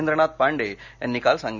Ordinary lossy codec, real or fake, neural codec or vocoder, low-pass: none; real; none; 7.2 kHz